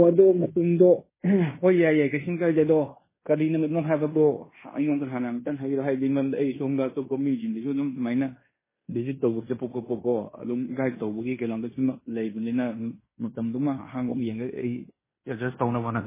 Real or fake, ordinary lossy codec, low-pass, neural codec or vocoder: fake; MP3, 16 kbps; 3.6 kHz; codec, 16 kHz in and 24 kHz out, 0.9 kbps, LongCat-Audio-Codec, fine tuned four codebook decoder